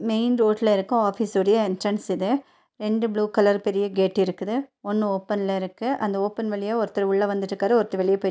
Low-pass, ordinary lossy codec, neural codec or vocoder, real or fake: none; none; none; real